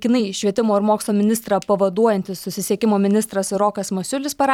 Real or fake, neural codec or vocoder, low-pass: fake; vocoder, 44.1 kHz, 128 mel bands every 256 samples, BigVGAN v2; 19.8 kHz